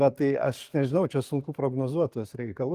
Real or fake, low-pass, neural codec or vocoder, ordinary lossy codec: fake; 14.4 kHz; codec, 44.1 kHz, 7.8 kbps, DAC; Opus, 24 kbps